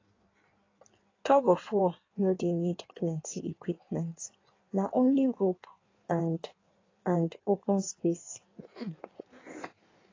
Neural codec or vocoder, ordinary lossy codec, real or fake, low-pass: codec, 16 kHz in and 24 kHz out, 1.1 kbps, FireRedTTS-2 codec; AAC, 32 kbps; fake; 7.2 kHz